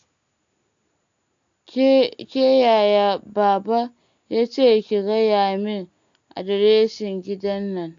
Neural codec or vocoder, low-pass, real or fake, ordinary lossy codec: none; 7.2 kHz; real; AAC, 64 kbps